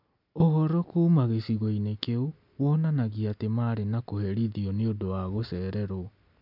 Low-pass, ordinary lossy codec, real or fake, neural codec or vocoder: 5.4 kHz; MP3, 48 kbps; real; none